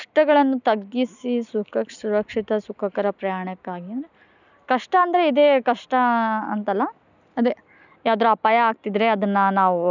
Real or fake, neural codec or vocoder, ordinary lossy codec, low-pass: real; none; none; 7.2 kHz